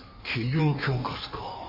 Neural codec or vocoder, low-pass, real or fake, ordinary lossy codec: codec, 16 kHz in and 24 kHz out, 1.1 kbps, FireRedTTS-2 codec; 5.4 kHz; fake; MP3, 32 kbps